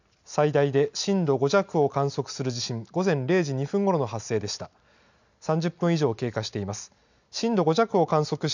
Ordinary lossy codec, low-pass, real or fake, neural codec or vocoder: none; 7.2 kHz; real; none